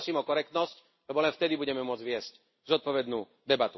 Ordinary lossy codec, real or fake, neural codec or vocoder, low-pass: MP3, 24 kbps; real; none; 7.2 kHz